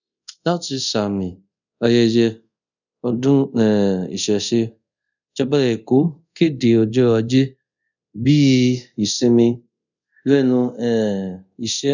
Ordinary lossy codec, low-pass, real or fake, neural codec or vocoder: none; 7.2 kHz; fake; codec, 24 kHz, 0.5 kbps, DualCodec